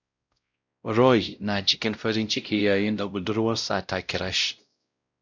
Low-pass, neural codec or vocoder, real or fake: 7.2 kHz; codec, 16 kHz, 0.5 kbps, X-Codec, WavLM features, trained on Multilingual LibriSpeech; fake